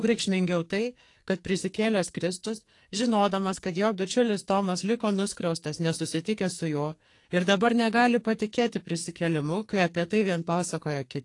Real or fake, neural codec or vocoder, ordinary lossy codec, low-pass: fake; codec, 44.1 kHz, 2.6 kbps, SNAC; AAC, 48 kbps; 10.8 kHz